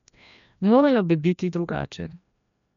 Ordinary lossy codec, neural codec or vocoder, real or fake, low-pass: none; codec, 16 kHz, 1 kbps, FreqCodec, larger model; fake; 7.2 kHz